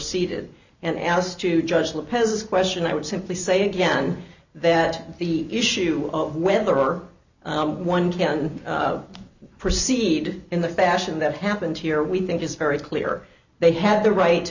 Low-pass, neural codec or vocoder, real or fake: 7.2 kHz; none; real